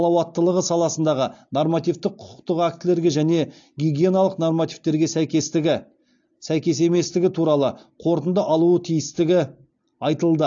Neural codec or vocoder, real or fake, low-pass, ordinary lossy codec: none; real; 7.2 kHz; AAC, 64 kbps